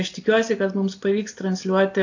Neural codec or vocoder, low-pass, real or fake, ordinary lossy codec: none; 7.2 kHz; real; AAC, 48 kbps